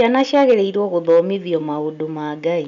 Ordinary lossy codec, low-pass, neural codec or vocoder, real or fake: none; 7.2 kHz; none; real